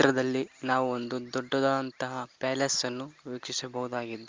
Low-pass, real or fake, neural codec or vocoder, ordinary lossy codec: 7.2 kHz; real; none; Opus, 24 kbps